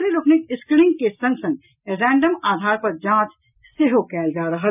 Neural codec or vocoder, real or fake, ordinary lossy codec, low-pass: none; real; none; 3.6 kHz